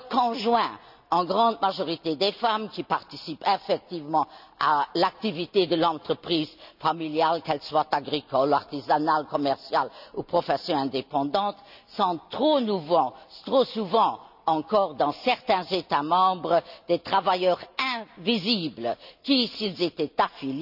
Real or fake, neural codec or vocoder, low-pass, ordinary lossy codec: real; none; 5.4 kHz; none